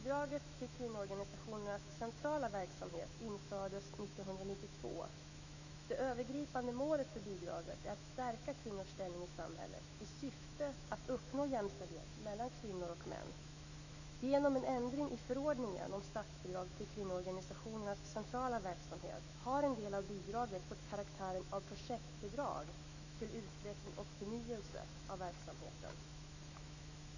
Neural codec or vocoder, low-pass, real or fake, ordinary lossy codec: autoencoder, 48 kHz, 128 numbers a frame, DAC-VAE, trained on Japanese speech; 7.2 kHz; fake; none